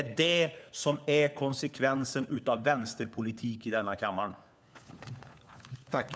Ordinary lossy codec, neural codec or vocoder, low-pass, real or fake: none; codec, 16 kHz, 4 kbps, FunCodec, trained on LibriTTS, 50 frames a second; none; fake